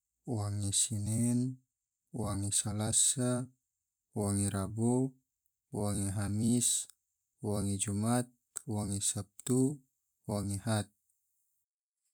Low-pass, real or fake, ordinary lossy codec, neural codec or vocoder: none; fake; none; vocoder, 44.1 kHz, 128 mel bands every 256 samples, BigVGAN v2